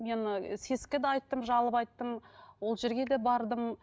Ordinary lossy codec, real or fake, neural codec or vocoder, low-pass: none; real; none; none